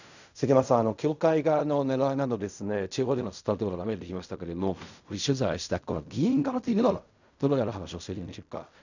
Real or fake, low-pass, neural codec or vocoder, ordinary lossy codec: fake; 7.2 kHz; codec, 16 kHz in and 24 kHz out, 0.4 kbps, LongCat-Audio-Codec, fine tuned four codebook decoder; none